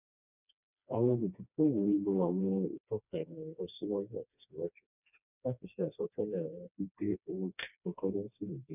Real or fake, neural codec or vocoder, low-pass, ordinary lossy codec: fake; codec, 16 kHz, 2 kbps, FreqCodec, smaller model; 3.6 kHz; none